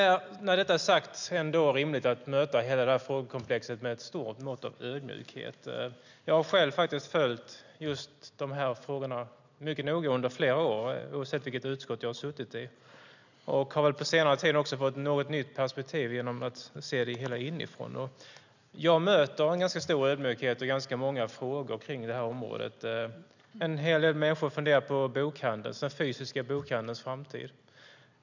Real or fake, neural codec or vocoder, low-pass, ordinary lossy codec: real; none; 7.2 kHz; none